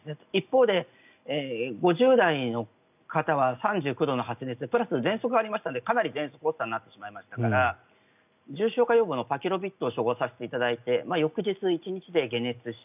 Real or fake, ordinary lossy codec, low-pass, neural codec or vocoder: real; none; 3.6 kHz; none